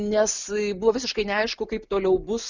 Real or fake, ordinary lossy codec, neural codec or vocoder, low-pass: real; Opus, 64 kbps; none; 7.2 kHz